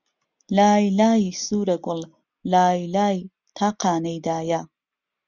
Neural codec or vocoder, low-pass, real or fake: none; 7.2 kHz; real